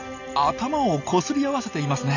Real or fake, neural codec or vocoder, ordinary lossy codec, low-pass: real; none; none; 7.2 kHz